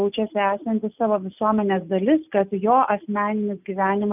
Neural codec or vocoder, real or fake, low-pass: none; real; 3.6 kHz